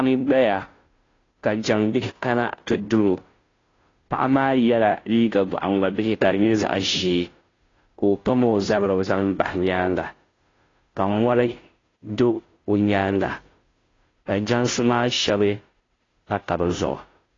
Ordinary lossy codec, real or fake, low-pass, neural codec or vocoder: AAC, 32 kbps; fake; 7.2 kHz; codec, 16 kHz, 0.5 kbps, FunCodec, trained on Chinese and English, 25 frames a second